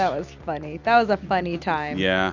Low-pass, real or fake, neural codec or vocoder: 7.2 kHz; real; none